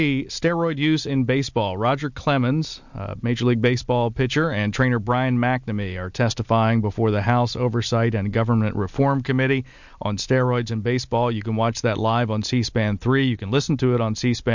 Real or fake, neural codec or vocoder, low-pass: real; none; 7.2 kHz